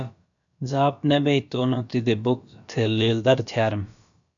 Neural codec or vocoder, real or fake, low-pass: codec, 16 kHz, about 1 kbps, DyCAST, with the encoder's durations; fake; 7.2 kHz